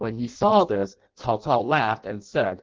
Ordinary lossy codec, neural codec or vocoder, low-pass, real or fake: Opus, 16 kbps; codec, 16 kHz in and 24 kHz out, 0.6 kbps, FireRedTTS-2 codec; 7.2 kHz; fake